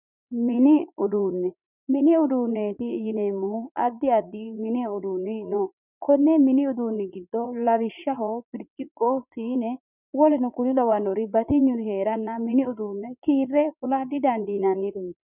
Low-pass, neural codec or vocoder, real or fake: 3.6 kHz; vocoder, 44.1 kHz, 80 mel bands, Vocos; fake